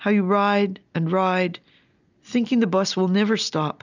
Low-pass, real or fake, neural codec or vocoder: 7.2 kHz; real; none